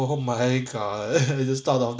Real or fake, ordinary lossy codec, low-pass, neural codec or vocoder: real; none; none; none